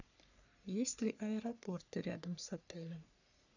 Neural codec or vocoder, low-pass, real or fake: codec, 44.1 kHz, 3.4 kbps, Pupu-Codec; 7.2 kHz; fake